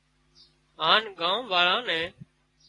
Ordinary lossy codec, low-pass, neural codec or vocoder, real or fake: AAC, 32 kbps; 10.8 kHz; none; real